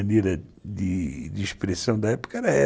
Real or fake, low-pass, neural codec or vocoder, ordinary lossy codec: real; none; none; none